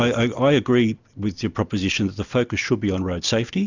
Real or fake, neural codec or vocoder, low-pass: real; none; 7.2 kHz